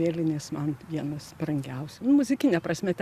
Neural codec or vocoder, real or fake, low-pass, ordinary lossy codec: none; real; 14.4 kHz; Opus, 64 kbps